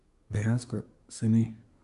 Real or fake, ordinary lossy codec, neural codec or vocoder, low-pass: fake; none; codec, 24 kHz, 1 kbps, SNAC; 10.8 kHz